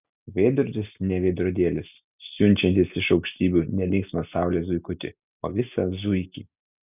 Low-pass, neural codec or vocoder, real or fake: 3.6 kHz; none; real